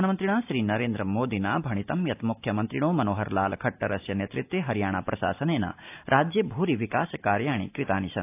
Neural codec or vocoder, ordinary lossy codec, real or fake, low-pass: none; AAC, 32 kbps; real; 3.6 kHz